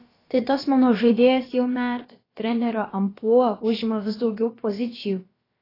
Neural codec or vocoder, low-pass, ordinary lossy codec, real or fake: codec, 16 kHz, about 1 kbps, DyCAST, with the encoder's durations; 5.4 kHz; AAC, 24 kbps; fake